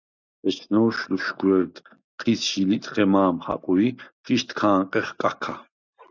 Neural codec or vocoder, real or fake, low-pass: none; real; 7.2 kHz